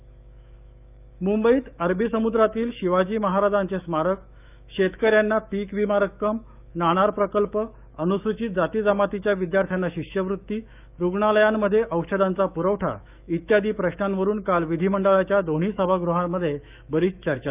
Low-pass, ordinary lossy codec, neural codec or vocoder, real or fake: 3.6 kHz; none; autoencoder, 48 kHz, 128 numbers a frame, DAC-VAE, trained on Japanese speech; fake